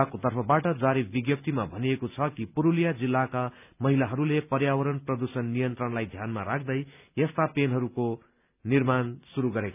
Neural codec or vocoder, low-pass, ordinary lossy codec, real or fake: none; 3.6 kHz; none; real